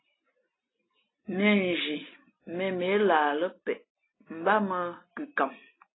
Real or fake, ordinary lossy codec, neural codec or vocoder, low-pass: real; AAC, 16 kbps; none; 7.2 kHz